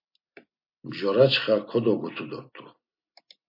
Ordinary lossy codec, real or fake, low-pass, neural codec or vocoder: MP3, 32 kbps; real; 5.4 kHz; none